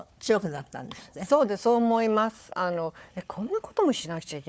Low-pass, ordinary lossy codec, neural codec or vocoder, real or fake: none; none; codec, 16 kHz, 4 kbps, FunCodec, trained on Chinese and English, 50 frames a second; fake